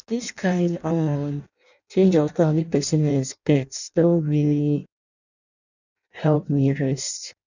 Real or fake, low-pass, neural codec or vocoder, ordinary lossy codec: fake; 7.2 kHz; codec, 16 kHz in and 24 kHz out, 0.6 kbps, FireRedTTS-2 codec; none